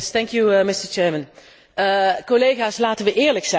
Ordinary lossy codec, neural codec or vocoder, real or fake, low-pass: none; none; real; none